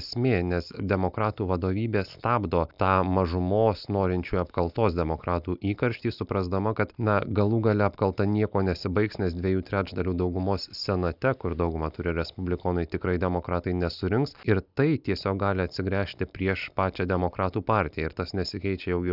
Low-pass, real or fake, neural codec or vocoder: 5.4 kHz; real; none